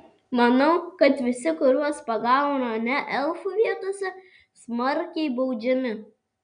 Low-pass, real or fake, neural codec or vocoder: 9.9 kHz; real; none